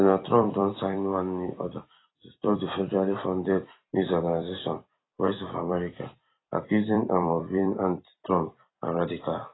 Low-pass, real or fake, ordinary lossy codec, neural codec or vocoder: 7.2 kHz; fake; AAC, 16 kbps; vocoder, 44.1 kHz, 128 mel bands every 256 samples, BigVGAN v2